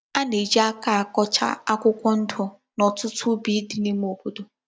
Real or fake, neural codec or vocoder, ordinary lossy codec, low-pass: real; none; none; none